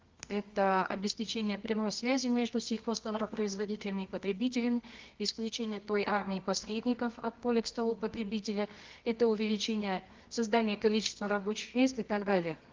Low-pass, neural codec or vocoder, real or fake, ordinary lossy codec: 7.2 kHz; codec, 24 kHz, 0.9 kbps, WavTokenizer, medium music audio release; fake; Opus, 32 kbps